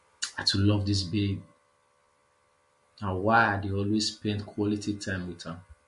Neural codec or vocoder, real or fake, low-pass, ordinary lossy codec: none; real; 14.4 kHz; MP3, 48 kbps